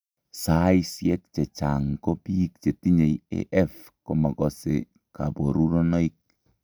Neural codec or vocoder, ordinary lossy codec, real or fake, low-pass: none; none; real; none